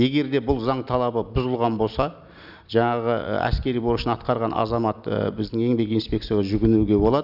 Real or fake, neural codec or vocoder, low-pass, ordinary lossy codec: real; none; 5.4 kHz; none